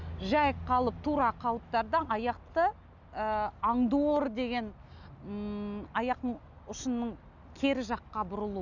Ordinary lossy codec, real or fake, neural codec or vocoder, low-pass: none; real; none; 7.2 kHz